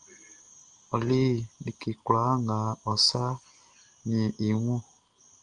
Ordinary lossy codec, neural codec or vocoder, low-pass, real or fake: Opus, 24 kbps; none; 9.9 kHz; real